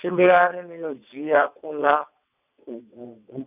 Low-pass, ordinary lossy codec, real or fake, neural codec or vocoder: 3.6 kHz; none; fake; vocoder, 22.05 kHz, 80 mel bands, WaveNeXt